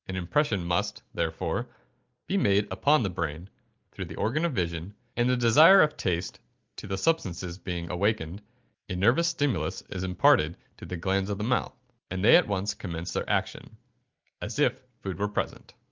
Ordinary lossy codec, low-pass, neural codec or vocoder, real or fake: Opus, 32 kbps; 7.2 kHz; none; real